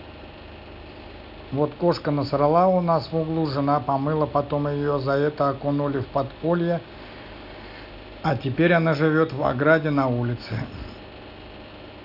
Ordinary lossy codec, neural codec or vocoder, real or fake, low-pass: none; none; real; 5.4 kHz